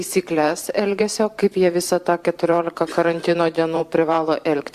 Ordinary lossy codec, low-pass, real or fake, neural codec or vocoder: Opus, 64 kbps; 14.4 kHz; fake; vocoder, 44.1 kHz, 128 mel bands every 512 samples, BigVGAN v2